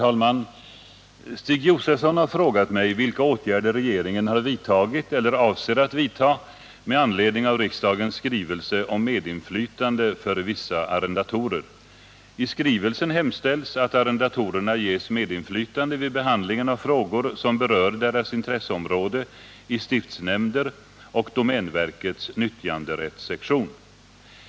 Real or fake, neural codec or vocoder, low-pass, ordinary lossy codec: real; none; none; none